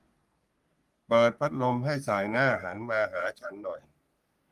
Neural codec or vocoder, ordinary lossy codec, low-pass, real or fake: codec, 44.1 kHz, 3.4 kbps, Pupu-Codec; Opus, 32 kbps; 14.4 kHz; fake